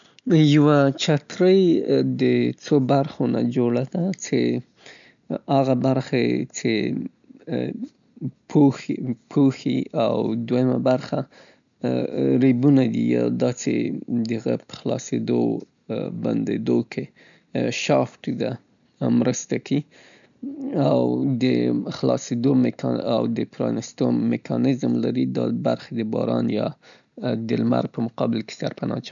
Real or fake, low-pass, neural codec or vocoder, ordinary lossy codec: real; 7.2 kHz; none; none